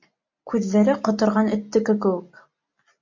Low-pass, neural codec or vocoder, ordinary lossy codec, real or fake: 7.2 kHz; none; MP3, 48 kbps; real